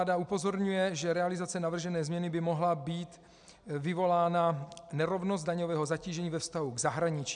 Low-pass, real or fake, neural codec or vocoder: 9.9 kHz; real; none